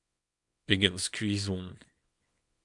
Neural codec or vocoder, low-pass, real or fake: codec, 24 kHz, 0.9 kbps, WavTokenizer, small release; 10.8 kHz; fake